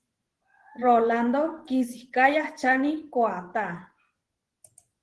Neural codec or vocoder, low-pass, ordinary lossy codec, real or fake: vocoder, 24 kHz, 100 mel bands, Vocos; 10.8 kHz; Opus, 16 kbps; fake